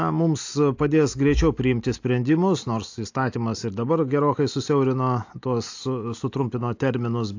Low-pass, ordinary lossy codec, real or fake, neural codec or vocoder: 7.2 kHz; AAC, 48 kbps; real; none